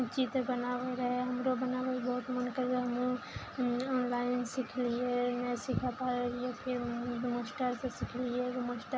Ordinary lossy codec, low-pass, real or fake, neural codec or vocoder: none; none; real; none